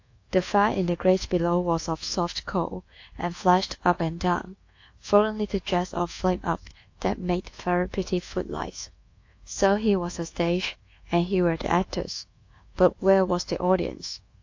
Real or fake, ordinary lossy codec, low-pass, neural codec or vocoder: fake; AAC, 48 kbps; 7.2 kHz; codec, 24 kHz, 1.2 kbps, DualCodec